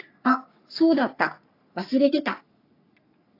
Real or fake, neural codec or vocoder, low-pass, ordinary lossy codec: fake; codec, 44.1 kHz, 3.4 kbps, Pupu-Codec; 5.4 kHz; AAC, 32 kbps